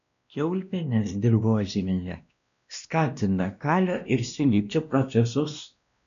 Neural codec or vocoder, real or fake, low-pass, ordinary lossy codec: codec, 16 kHz, 1 kbps, X-Codec, WavLM features, trained on Multilingual LibriSpeech; fake; 7.2 kHz; AAC, 96 kbps